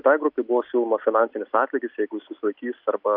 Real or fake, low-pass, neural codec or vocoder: real; 5.4 kHz; none